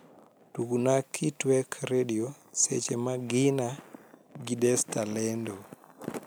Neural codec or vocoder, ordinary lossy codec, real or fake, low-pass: none; none; real; none